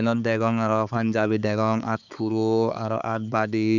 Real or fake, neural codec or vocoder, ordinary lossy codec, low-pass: fake; codec, 16 kHz, 4 kbps, X-Codec, HuBERT features, trained on balanced general audio; none; 7.2 kHz